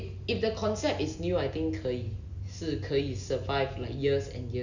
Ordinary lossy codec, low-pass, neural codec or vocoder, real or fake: none; 7.2 kHz; none; real